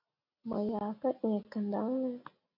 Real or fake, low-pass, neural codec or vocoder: real; 5.4 kHz; none